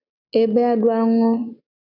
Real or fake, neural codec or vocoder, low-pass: real; none; 5.4 kHz